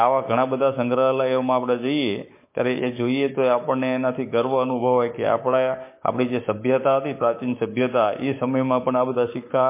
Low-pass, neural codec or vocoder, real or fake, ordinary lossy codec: 3.6 kHz; codec, 16 kHz, 6 kbps, DAC; fake; MP3, 24 kbps